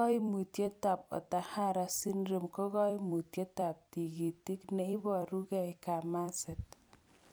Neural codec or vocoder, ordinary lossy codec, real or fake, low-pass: vocoder, 44.1 kHz, 128 mel bands every 256 samples, BigVGAN v2; none; fake; none